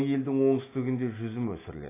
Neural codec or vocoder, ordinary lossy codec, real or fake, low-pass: none; AAC, 16 kbps; real; 3.6 kHz